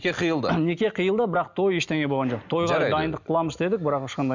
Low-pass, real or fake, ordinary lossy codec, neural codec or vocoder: 7.2 kHz; real; none; none